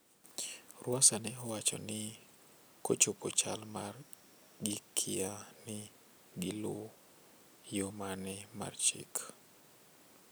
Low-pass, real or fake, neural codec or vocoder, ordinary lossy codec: none; real; none; none